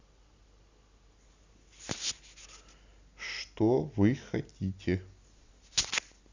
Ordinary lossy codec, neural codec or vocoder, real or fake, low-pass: Opus, 64 kbps; none; real; 7.2 kHz